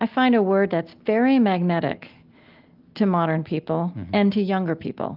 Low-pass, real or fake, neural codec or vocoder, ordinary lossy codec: 5.4 kHz; real; none; Opus, 32 kbps